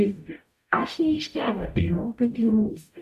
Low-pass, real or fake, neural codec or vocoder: 14.4 kHz; fake; codec, 44.1 kHz, 0.9 kbps, DAC